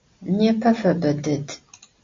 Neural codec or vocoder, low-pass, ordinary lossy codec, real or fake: none; 7.2 kHz; MP3, 48 kbps; real